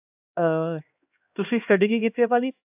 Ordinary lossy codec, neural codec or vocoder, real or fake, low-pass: AAC, 32 kbps; codec, 16 kHz, 1 kbps, X-Codec, HuBERT features, trained on LibriSpeech; fake; 3.6 kHz